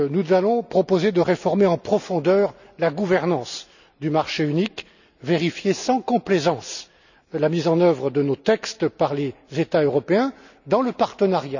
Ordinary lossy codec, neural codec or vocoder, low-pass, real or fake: none; none; 7.2 kHz; real